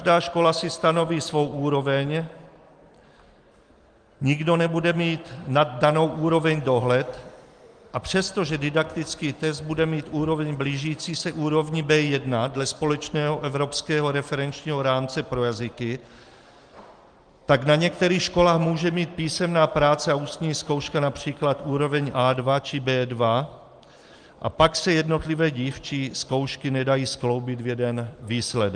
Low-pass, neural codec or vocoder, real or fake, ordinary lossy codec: 9.9 kHz; none; real; Opus, 24 kbps